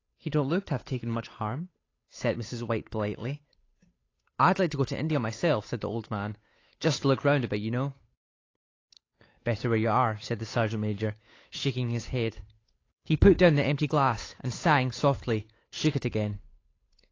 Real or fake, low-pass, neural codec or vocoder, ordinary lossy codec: fake; 7.2 kHz; codec, 16 kHz, 8 kbps, FunCodec, trained on Chinese and English, 25 frames a second; AAC, 32 kbps